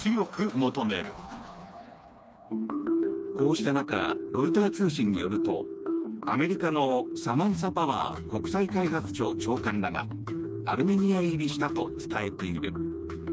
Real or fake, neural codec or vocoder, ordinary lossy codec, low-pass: fake; codec, 16 kHz, 2 kbps, FreqCodec, smaller model; none; none